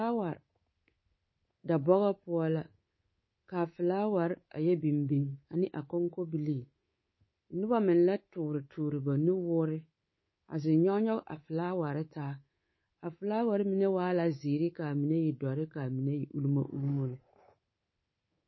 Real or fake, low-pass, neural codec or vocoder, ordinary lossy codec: fake; 7.2 kHz; codec, 24 kHz, 3.1 kbps, DualCodec; MP3, 24 kbps